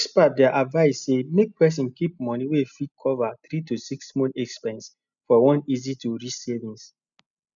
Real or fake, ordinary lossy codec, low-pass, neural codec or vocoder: real; none; 7.2 kHz; none